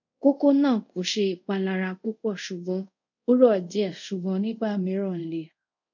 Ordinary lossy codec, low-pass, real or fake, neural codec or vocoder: none; 7.2 kHz; fake; codec, 24 kHz, 0.5 kbps, DualCodec